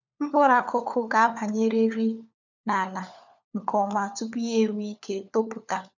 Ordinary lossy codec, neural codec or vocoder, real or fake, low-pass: none; codec, 16 kHz, 4 kbps, FunCodec, trained on LibriTTS, 50 frames a second; fake; 7.2 kHz